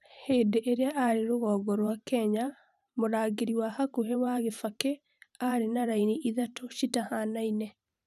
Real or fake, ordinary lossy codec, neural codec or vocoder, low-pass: fake; none; vocoder, 44.1 kHz, 128 mel bands every 512 samples, BigVGAN v2; 14.4 kHz